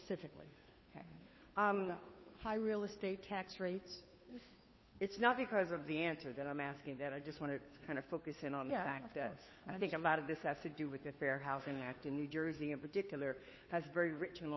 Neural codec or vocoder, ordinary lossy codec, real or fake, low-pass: codec, 16 kHz, 2 kbps, FunCodec, trained on Chinese and English, 25 frames a second; MP3, 24 kbps; fake; 7.2 kHz